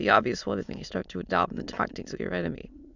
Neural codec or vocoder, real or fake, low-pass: autoencoder, 22.05 kHz, a latent of 192 numbers a frame, VITS, trained on many speakers; fake; 7.2 kHz